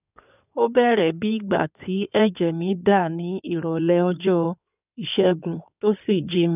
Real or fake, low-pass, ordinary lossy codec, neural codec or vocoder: fake; 3.6 kHz; none; codec, 16 kHz in and 24 kHz out, 2.2 kbps, FireRedTTS-2 codec